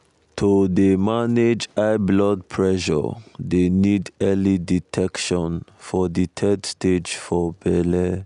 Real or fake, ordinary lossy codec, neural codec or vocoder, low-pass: real; none; none; 10.8 kHz